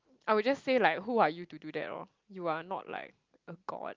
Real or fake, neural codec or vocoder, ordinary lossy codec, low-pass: real; none; Opus, 32 kbps; 7.2 kHz